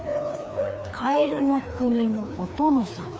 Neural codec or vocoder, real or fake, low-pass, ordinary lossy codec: codec, 16 kHz, 2 kbps, FreqCodec, larger model; fake; none; none